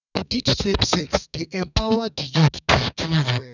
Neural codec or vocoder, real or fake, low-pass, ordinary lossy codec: codec, 32 kHz, 1.9 kbps, SNAC; fake; 7.2 kHz; none